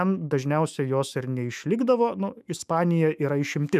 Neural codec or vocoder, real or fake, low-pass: autoencoder, 48 kHz, 128 numbers a frame, DAC-VAE, trained on Japanese speech; fake; 14.4 kHz